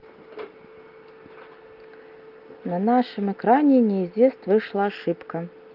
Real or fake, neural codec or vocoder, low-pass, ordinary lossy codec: real; none; 5.4 kHz; Opus, 24 kbps